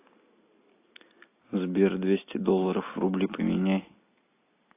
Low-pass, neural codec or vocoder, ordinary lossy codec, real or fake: 3.6 kHz; none; AAC, 24 kbps; real